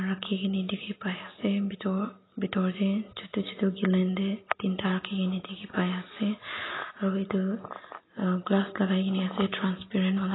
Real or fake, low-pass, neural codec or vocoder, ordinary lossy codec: real; 7.2 kHz; none; AAC, 16 kbps